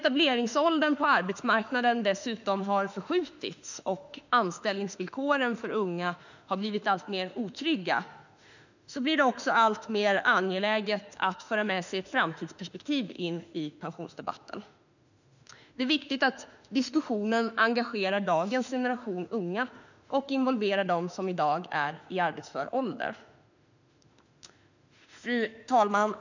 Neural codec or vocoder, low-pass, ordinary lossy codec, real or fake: autoencoder, 48 kHz, 32 numbers a frame, DAC-VAE, trained on Japanese speech; 7.2 kHz; none; fake